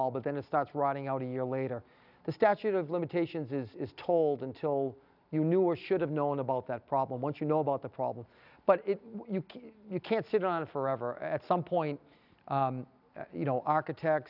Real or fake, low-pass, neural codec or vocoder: real; 5.4 kHz; none